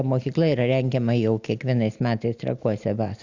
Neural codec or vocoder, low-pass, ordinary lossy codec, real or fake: none; 7.2 kHz; Opus, 64 kbps; real